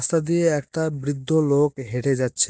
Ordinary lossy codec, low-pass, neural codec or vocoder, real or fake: none; none; none; real